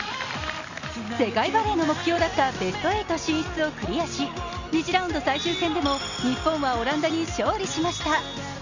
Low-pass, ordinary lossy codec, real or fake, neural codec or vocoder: 7.2 kHz; none; real; none